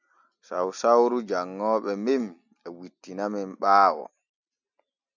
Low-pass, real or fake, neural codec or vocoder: 7.2 kHz; real; none